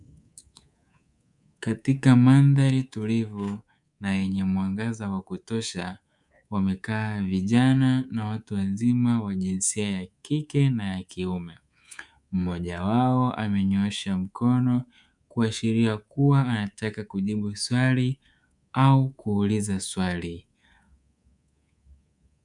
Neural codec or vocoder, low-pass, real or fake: codec, 24 kHz, 3.1 kbps, DualCodec; 10.8 kHz; fake